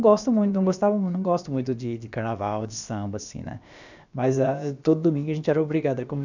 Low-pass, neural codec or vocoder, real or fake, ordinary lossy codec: 7.2 kHz; codec, 16 kHz, about 1 kbps, DyCAST, with the encoder's durations; fake; none